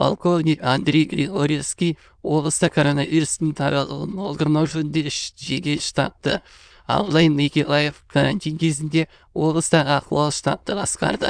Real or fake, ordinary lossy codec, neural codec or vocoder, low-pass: fake; none; autoencoder, 22.05 kHz, a latent of 192 numbers a frame, VITS, trained on many speakers; 9.9 kHz